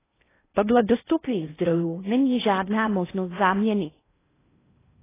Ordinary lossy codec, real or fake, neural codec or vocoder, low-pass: AAC, 16 kbps; fake; codec, 16 kHz in and 24 kHz out, 0.6 kbps, FocalCodec, streaming, 2048 codes; 3.6 kHz